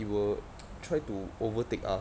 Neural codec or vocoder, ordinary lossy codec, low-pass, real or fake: none; none; none; real